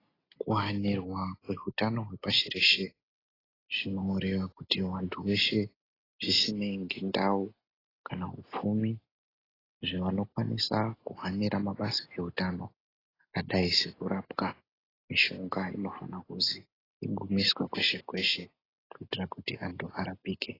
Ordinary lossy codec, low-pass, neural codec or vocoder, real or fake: AAC, 24 kbps; 5.4 kHz; none; real